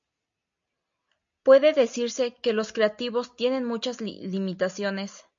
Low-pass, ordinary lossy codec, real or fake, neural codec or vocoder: 7.2 kHz; AAC, 64 kbps; real; none